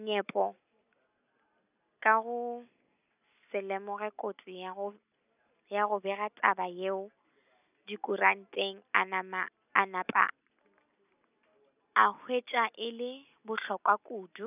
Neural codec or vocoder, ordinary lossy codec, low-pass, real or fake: none; none; 3.6 kHz; real